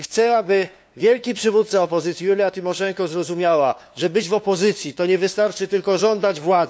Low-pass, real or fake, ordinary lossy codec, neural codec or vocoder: none; fake; none; codec, 16 kHz, 2 kbps, FunCodec, trained on LibriTTS, 25 frames a second